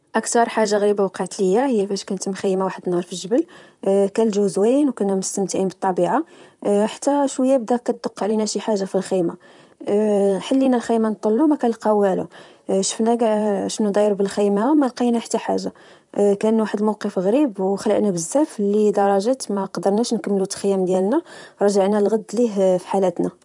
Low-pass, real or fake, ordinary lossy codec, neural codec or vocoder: 10.8 kHz; fake; none; vocoder, 44.1 kHz, 128 mel bands, Pupu-Vocoder